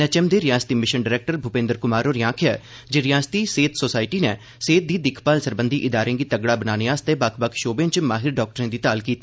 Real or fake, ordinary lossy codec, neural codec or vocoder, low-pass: real; none; none; none